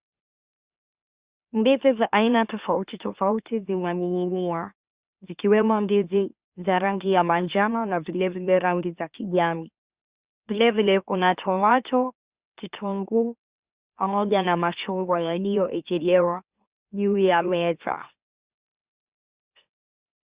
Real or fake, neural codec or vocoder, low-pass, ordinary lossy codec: fake; autoencoder, 44.1 kHz, a latent of 192 numbers a frame, MeloTTS; 3.6 kHz; Opus, 64 kbps